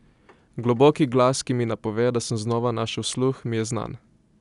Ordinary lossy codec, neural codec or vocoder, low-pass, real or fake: none; none; 10.8 kHz; real